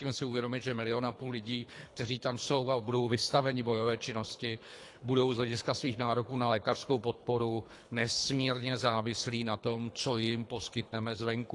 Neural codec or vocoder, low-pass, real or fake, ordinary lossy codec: codec, 24 kHz, 3 kbps, HILCodec; 10.8 kHz; fake; AAC, 48 kbps